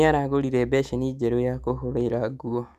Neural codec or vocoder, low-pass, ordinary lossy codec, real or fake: autoencoder, 48 kHz, 128 numbers a frame, DAC-VAE, trained on Japanese speech; 14.4 kHz; Opus, 64 kbps; fake